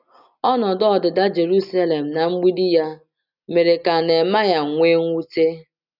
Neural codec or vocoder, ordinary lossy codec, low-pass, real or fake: none; none; 5.4 kHz; real